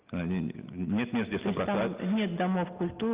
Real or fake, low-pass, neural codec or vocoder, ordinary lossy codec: real; 3.6 kHz; none; Opus, 24 kbps